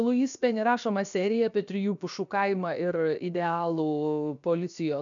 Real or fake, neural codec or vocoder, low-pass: fake; codec, 16 kHz, 0.7 kbps, FocalCodec; 7.2 kHz